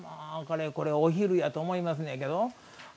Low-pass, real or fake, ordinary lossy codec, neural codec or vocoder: none; real; none; none